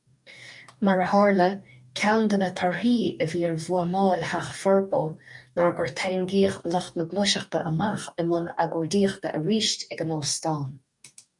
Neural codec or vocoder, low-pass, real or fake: codec, 44.1 kHz, 2.6 kbps, DAC; 10.8 kHz; fake